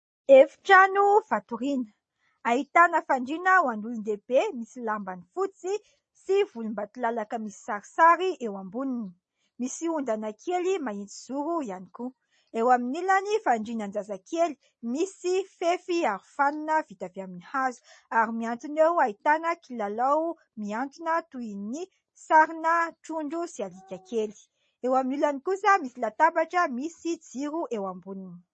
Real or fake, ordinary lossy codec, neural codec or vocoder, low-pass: real; MP3, 32 kbps; none; 9.9 kHz